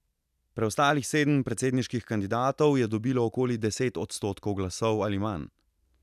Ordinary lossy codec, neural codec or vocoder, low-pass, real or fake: none; none; 14.4 kHz; real